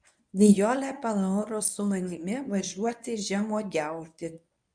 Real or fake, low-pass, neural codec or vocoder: fake; 9.9 kHz; codec, 24 kHz, 0.9 kbps, WavTokenizer, medium speech release version 1